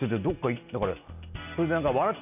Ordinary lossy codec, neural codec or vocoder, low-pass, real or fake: none; none; 3.6 kHz; real